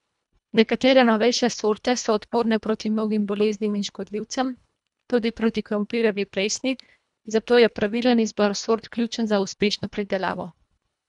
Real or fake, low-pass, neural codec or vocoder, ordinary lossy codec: fake; 10.8 kHz; codec, 24 kHz, 1.5 kbps, HILCodec; none